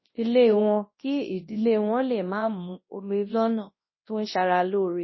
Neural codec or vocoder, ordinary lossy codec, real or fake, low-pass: codec, 24 kHz, 0.9 kbps, WavTokenizer, large speech release; MP3, 24 kbps; fake; 7.2 kHz